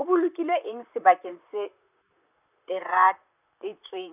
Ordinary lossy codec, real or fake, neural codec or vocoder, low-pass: none; fake; vocoder, 44.1 kHz, 128 mel bands, Pupu-Vocoder; 3.6 kHz